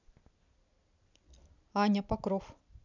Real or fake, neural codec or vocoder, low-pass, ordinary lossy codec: real; none; 7.2 kHz; none